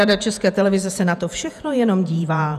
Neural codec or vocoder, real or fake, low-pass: vocoder, 48 kHz, 128 mel bands, Vocos; fake; 14.4 kHz